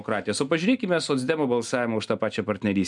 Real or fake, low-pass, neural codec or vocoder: real; 10.8 kHz; none